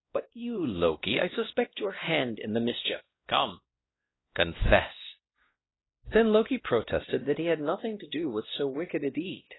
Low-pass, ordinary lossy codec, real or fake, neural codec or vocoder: 7.2 kHz; AAC, 16 kbps; fake; codec, 16 kHz, 1 kbps, X-Codec, WavLM features, trained on Multilingual LibriSpeech